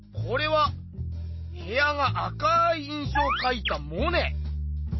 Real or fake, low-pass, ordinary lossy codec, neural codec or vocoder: real; 7.2 kHz; MP3, 24 kbps; none